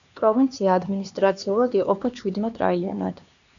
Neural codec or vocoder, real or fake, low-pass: codec, 16 kHz, 2 kbps, X-Codec, HuBERT features, trained on LibriSpeech; fake; 7.2 kHz